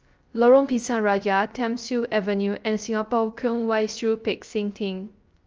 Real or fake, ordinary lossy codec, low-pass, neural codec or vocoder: fake; Opus, 24 kbps; 7.2 kHz; codec, 16 kHz, 0.3 kbps, FocalCodec